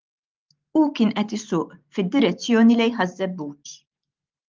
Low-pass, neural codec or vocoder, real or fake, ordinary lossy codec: 7.2 kHz; none; real; Opus, 32 kbps